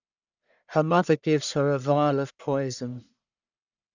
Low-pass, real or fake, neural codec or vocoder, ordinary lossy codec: 7.2 kHz; fake; codec, 44.1 kHz, 1.7 kbps, Pupu-Codec; none